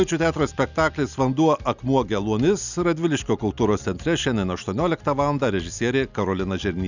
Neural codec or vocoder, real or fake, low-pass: none; real; 7.2 kHz